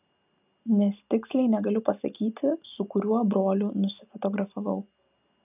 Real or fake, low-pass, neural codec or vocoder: real; 3.6 kHz; none